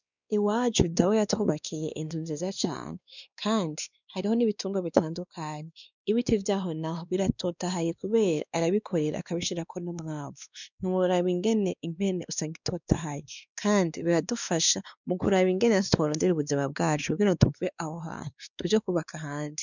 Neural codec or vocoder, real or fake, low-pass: codec, 16 kHz, 2 kbps, X-Codec, WavLM features, trained on Multilingual LibriSpeech; fake; 7.2 kHz